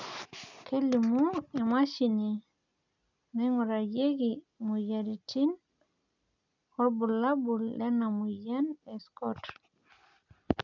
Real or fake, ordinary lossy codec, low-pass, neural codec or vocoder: real; none; 7.2 kHz; none